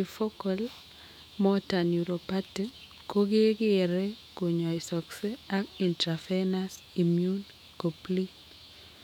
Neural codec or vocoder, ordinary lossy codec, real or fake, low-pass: autoencoder, 48 kHz, 128 numbers a frame, DAC-VAE, trained on Japanese speech; none; fake; 19.8 kHz